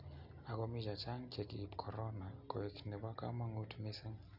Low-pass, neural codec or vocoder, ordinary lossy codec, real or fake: 5.4 kHz; none; none; real